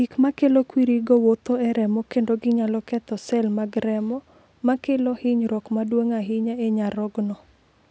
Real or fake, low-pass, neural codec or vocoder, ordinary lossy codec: real; none; none; none